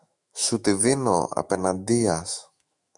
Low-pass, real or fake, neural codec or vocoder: 10.8 kHz; fake; autoencoder, 48 kHz, 128 numbers a frame, DAC-VAE, trained on Japanese speech